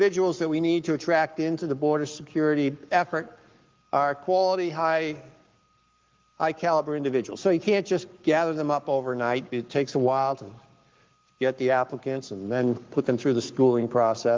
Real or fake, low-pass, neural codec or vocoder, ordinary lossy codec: fake; 7.2 kHz; codec, 44.1 kHz, 7.8 kbps, Pupu-Codec; Opus, 32 kbps